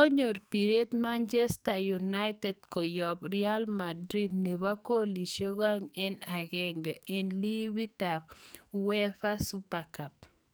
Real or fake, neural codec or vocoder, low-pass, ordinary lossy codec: fake; codec, 44.1 kHz, 2.6 kbps, SNAC; none; none